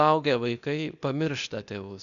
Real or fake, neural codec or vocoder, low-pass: fake; codec, 16 kHz, 0.8 kbps, ZipCodec; 7.2 kHz